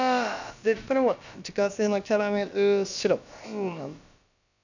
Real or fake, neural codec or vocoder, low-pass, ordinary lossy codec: fake; codec, 16 kHz, about 1 kbps, DyCAST, with the encoder's durations; 7.2 kHz; none